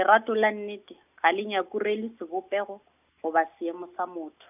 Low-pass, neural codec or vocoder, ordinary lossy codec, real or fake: 3.6 kHz; none; none; real